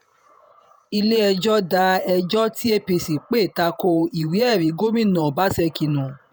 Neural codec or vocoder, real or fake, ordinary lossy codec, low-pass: vocoder, 48 kHz, 128 mel bands, Vocos; fake; none; none